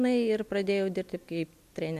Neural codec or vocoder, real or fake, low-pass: none; real; 14.4 kHz